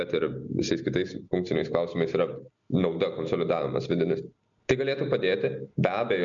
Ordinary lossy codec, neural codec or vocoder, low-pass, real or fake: MP3, 64 kbps; none; 7.2 kHz; real